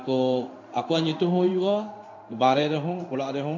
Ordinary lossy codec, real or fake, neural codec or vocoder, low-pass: AAC, 48 kbps; fake; codec, 16 kHz in and 24 kHz out, 1 kbps, XY-Tokenizer; 7.2 kHz